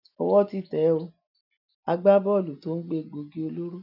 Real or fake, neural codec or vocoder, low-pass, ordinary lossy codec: real; none; 5.4 kHz; none